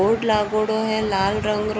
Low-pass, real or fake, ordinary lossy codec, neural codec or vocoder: none; real; none; none